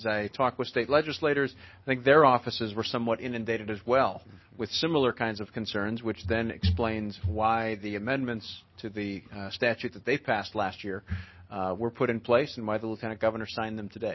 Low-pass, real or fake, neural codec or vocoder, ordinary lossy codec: 7.2 kHz; real; none; MP3, 24 kbps